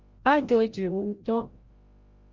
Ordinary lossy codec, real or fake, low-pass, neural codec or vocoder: Opus, 32 kbps; fake; 7.2 kHz; codec, 16 kHz, 0.5 kbps, FreqCodec, larger model